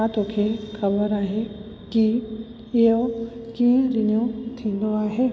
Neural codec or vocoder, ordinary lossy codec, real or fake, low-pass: none; none; real; none